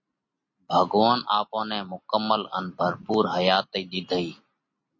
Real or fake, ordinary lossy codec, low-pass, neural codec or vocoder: real; MP3, 32 kbps; 7.2 kHz; none